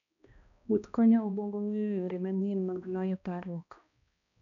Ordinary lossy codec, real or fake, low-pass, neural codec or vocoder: none; fake; 7.2 kHz; codec, 16 kHz, 1 kbps, X-Codec, HuBERT features, trained on balanced general audio